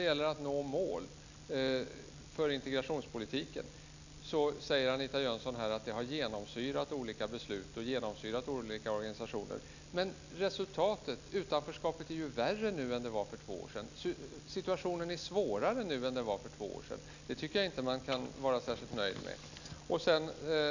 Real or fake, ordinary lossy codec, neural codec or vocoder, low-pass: real; none; none; 7.2 kHz